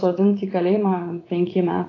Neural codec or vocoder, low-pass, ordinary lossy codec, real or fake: vocoder, 44.1 kHz, 80 mel bands, Vocos; 7.2 kHz; AAC, 32 kbps; fake